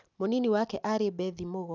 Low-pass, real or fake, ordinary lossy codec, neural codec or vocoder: none; real; none; none